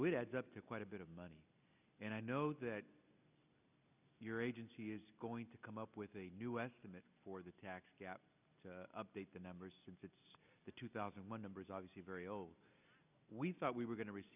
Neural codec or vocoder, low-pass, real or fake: none; 3.6 kHz; real